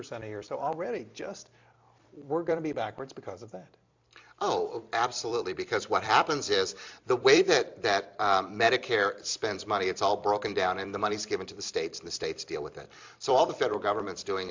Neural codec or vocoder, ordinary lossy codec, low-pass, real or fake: vocoder, 44.1 kHz, 128 mel bands every 256 samples, BigVGAN v2; MP3, 64 kbps; 7.2 kHz; fake